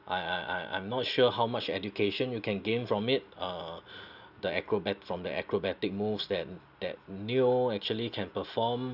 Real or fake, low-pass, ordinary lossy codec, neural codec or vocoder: real; 5.4 kHz; none; none